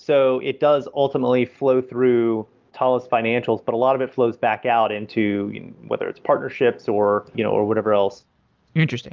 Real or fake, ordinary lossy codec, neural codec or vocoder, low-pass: real; Opus, 24 kbps; none; 7.2 kHz